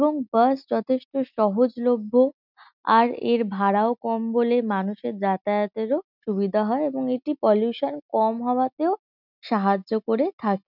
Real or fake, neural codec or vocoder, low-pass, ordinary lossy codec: real; none; 5.4 kHz; none